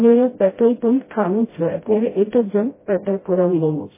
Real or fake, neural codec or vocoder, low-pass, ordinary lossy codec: fake; codec, 16 kHz, 0.5 kbps, FreqCodec, smaller model; 3.6 kHz; MP3, 16 kbps